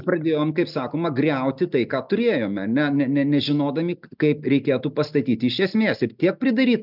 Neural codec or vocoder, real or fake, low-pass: none; real; 5.4 kHz